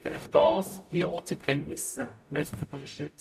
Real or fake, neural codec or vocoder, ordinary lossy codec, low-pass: fake; codec, 44.1 kHz, 0.9 kbps, DAC; none; 14.4 kHz